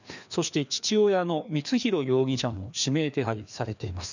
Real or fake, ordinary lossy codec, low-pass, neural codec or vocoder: fake; none; 7.2 kHz; codec, 16 kHz, 2 kbps, FreqCodec, larger model